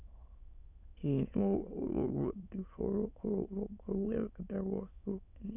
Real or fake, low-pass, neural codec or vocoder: fake; 3.6 kHz; autoencoder, 22.05 kHz, a latent of 192 numbers a frame, VITS, trained on many speakers